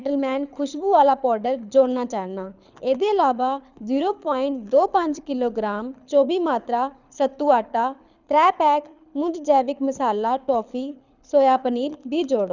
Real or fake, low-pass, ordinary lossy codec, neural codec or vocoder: fake; 7.2 kHz; none; codec, 24 kHz, 6 kbps, HILCodec